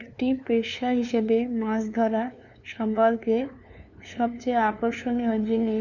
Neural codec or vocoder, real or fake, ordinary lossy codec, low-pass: codec, 16 kHz, 2 kbps, FunCodec, trained on LibriTTS, 25 frames a second; fake; none; 7.2 kHz